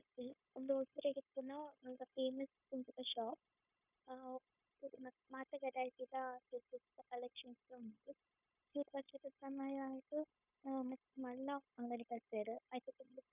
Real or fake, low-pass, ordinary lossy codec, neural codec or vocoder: fake; 3.6 kHz; none; codec, 16 kHz, 0.9 kbps, LongCat-Audio-Codec